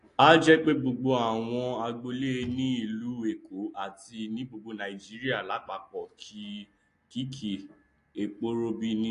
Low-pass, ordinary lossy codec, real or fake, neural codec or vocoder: 10.8 kHz; AAC, 48 kbps; real; none